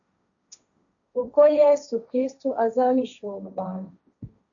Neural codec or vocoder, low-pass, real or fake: codec, 16 kHz, 1.1 kbps, Voila-Tokenizer; 7.2 kHz; fake